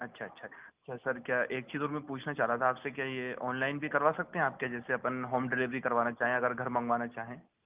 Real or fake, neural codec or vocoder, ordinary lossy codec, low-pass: real; none; Opus, 24 kbps; 3.6 kHz